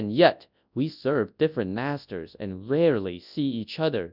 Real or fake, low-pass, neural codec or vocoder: fake; 5.4 kHz; codec, 24 kHz, 0.9 kbps, WavTokenizer, large speech release